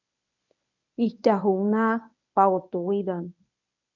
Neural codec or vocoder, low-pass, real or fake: codec, 24 kHz, 0.9 kbps, WavTokenizer, medium speech release version 1; 7.2 kHz; fake